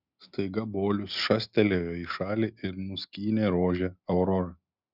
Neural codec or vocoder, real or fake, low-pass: none; real; 5.4 kHz